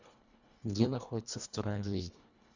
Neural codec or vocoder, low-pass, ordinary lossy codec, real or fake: codec, 24 kHz, 1.5 kbps, HILCodec; 7.2 kHz; Opus, 64 kbps; fake